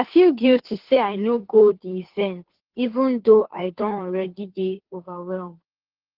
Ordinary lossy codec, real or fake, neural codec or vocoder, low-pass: Opus, 16 kbps; fake; codec, 16 kHz, 2 kbps, FunCodec, trained on Chinese and English, 25 frames a second; 5.4 kHz